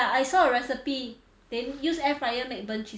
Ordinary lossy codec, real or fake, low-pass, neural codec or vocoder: none; real; none; none